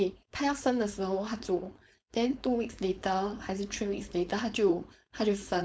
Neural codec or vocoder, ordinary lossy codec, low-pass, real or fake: codec, 16 kHz, 4.8 kbps, FACodec; none; none; fake